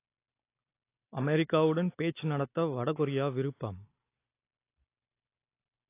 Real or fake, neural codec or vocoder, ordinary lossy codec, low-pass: real; none; AAC, 24 kbps; 3.6 kHz